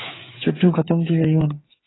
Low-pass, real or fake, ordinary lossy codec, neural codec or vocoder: 7.2 kHz; fake; AAC, 16 kbps; codec, 16 kHz, 8 kbps, FreqCodec, smaller model